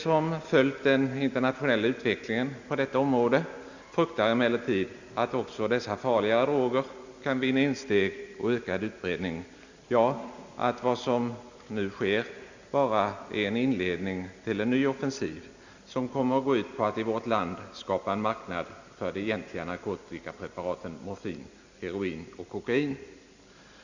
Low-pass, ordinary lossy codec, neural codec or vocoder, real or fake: 7.2 kHz; Opus, 64 kbps; none; real